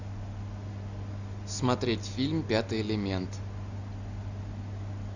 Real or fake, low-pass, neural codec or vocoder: real; 7.2 kHz; none